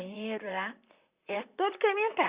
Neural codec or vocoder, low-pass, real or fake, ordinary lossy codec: vocoder, 44.1 kHz, 128 mel bands, Pupu-Vocoder; 3.6 kHz; fake; Opus, 64 kbps